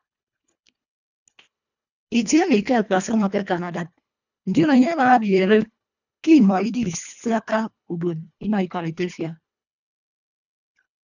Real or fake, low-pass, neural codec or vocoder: fake; 7.2 kHz; codec, 24 kHz, 1.5 kbps, HILCodec